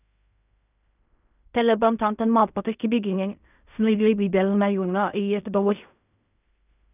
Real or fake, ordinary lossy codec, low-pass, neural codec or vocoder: fake; none; 3.6 kHz; codec, 16 kHz in and 24 kHz out, 0.4 kbps, LongCat-Audio-Codec, fine tuned four codebook decoder